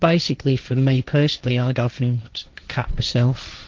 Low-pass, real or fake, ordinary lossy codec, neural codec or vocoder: 7.2 kHz; fake; Opus, 24 kbps; codec, 16 kHz, 1.1 kbps, Voila-Tokenizer